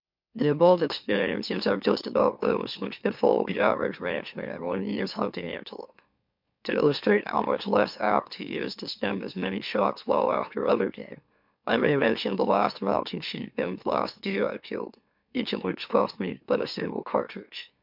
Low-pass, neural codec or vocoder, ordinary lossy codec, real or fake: 5.4 kHz; autoencoder, 44.1 kHz, a latent of 192 numbers a frame, MeloTTS; MP3, 48 kbps; fake